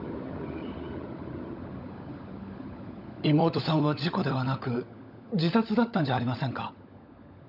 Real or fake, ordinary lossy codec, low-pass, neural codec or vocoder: fake; none; 5.4 kHz; codec, 16 kHz, 16 kbps, FunCodec, trained on LibriTTS, 50 frames a second